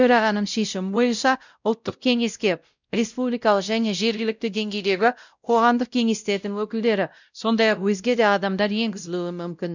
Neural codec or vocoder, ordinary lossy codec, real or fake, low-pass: codec, 16 kHz, 0.5 kbps, X-Codec, WavLM features, trained on Multilingual LibriSpeech; none; fake; 7.2 kHz